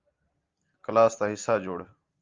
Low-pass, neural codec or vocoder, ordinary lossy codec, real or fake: 7.2 kHz; none; Opus, 32 kbps; real